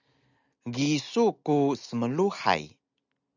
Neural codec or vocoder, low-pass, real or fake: none; 7.2 kHz; real